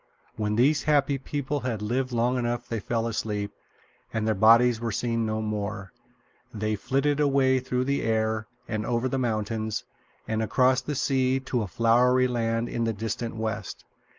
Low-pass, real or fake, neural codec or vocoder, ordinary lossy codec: 7.2 kHz; real; none; Opus, 24 kbps